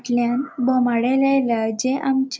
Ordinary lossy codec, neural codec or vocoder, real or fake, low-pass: none; none; real; none